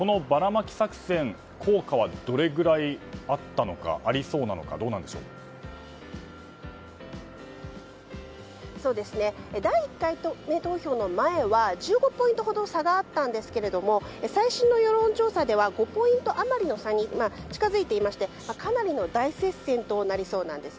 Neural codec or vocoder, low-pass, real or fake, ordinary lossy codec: none; none; real; none